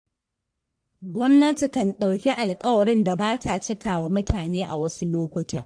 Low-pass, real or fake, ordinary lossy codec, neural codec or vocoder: 9.9 kHz; fake; AAC, 64 kbps; codec, 44.1 kHz, 1.7 kbps, Pupu-Codec